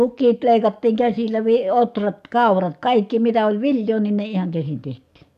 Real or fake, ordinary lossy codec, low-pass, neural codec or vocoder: fake; none; 14.4 kHz; codec, 44.1 kHz, 7.8 kbps, DAC